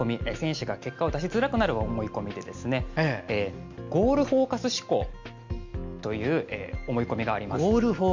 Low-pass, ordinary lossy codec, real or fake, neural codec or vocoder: 7.2 kHz; MP3, 48 kbps; real; none